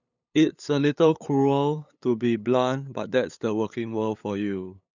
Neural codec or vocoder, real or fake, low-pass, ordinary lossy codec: codec, 16 kHz, 8 kbps, FunCodec, trained on LibriTTS, 25 frames a second; fake; 7.2 kHz; none